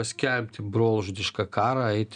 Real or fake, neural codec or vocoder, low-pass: real; none; 9.9 kHz